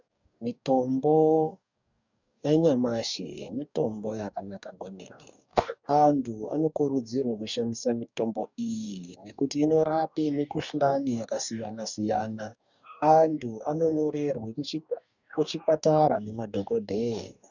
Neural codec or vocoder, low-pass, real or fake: codec, 44.1 kHz, 2.6 kbps, DAC; 7.2 kHz; fake